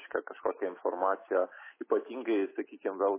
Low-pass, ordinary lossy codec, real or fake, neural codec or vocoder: 3.6 kHz; MP3, 16 kbps; real; none